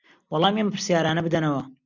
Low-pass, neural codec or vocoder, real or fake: 7.2 kHz; none; real